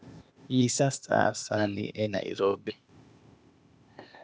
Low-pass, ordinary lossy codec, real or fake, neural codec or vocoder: none; none; fake; codec, 16 kHz, 0.8 kbps, ZipCodec